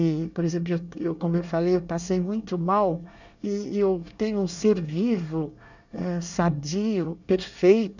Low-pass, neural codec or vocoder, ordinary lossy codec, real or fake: 7.2 kHz; codec, 24 kHz, 1 kbps, SNAC; none; fake